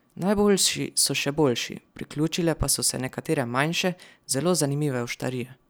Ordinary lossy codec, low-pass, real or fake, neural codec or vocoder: none; none; real; none